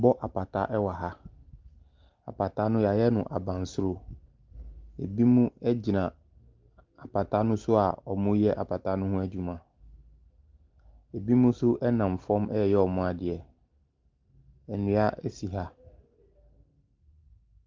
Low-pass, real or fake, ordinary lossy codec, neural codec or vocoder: 7.2 kHz; real; Opus, 24 kbps; none